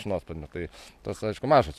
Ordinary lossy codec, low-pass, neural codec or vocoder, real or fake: AAC, 96 kbps; 14.4 kHz; none; real